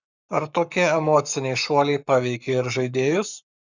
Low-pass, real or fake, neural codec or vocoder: 7.2 kHz; fake; codec, 44.1 kHz, 7.8 kbps, Pupu-Codec